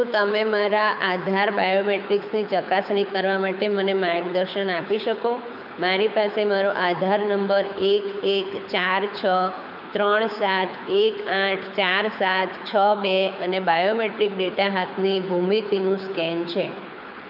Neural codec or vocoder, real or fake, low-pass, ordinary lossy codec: codec, 24 kHz, 6 kbps, HILCodec; fake; 5.4 kHz; none